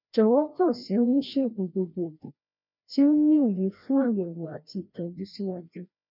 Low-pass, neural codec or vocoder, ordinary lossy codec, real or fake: 5.4 kHz; codec, 16 kHz, 1 kbps, FreqCodec, larger model; none; fake